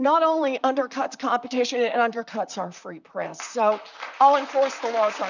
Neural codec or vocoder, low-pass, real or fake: vocoder, 44.1 kHz, 128 mel bands, Pupu-Vocoder; 7.2 kHz; fake